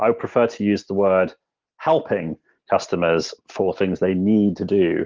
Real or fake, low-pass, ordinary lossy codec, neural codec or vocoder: real; 7.2 kHz; Opus, 16 kbps; none